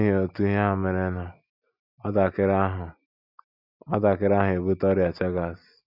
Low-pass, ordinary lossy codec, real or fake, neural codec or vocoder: 5.4 kHz; none; real; none